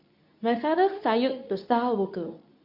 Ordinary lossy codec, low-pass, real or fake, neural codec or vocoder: none; 5.4 kHz; fake; codec, 24 kHz, 0.9 kbps, WavTokenizer, medium speech release version 2